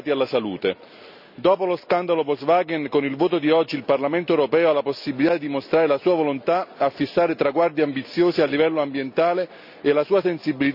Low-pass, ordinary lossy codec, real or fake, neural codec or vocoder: 5.4 kHz; none; real; none